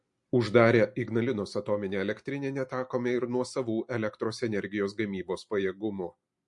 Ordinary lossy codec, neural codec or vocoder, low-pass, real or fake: MP3, 48 kbps; none; 10.8 kHz; real